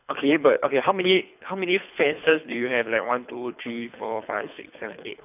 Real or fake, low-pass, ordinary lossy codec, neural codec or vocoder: fake; 3.6 kHz; none; codec, 24 kHz, 3 kbps, HILCodec